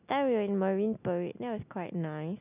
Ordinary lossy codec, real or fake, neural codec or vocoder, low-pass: none; real; none; 3.6 kHz